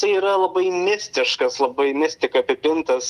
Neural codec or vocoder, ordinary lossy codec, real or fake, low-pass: none; Opus, 32 kbps; real; 14.4 kHz